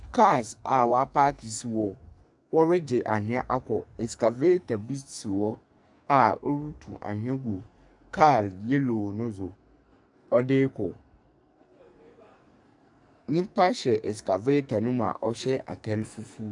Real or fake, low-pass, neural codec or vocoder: fake; 10.8 kHz; codec, 44.1 kHz, 2.6 kbps, SNAC